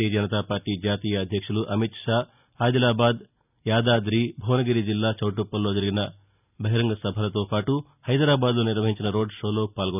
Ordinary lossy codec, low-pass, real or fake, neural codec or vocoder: none; 3.6 kHz; real; none